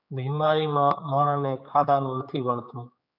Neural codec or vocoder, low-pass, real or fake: codec, 16 kHz, 4 kbps, X-Codec, HuBERT features, trained on general audio; 5.4 kHz; fake